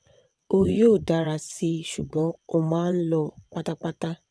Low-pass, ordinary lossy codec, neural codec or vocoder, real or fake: none; none; vocoder, 22.05 kHz, 80 mel bands, WaveNeXt; fake